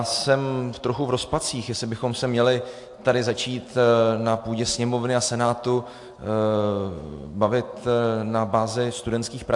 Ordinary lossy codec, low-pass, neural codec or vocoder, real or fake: AAC, 64 kbps; 10.8 kHz; none; real